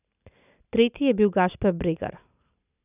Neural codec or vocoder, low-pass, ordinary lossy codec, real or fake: none; 3.6 kHz; none; real